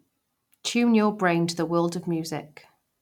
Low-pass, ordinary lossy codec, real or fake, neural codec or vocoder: 19.8 kHz; none; real; none